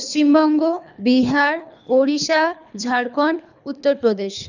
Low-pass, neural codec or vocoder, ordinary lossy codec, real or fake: 7.2 kHz; codec, 24 kHz, 3 kbps, HILCodec; none; fake